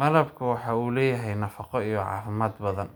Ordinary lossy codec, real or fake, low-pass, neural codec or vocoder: none; real; none; none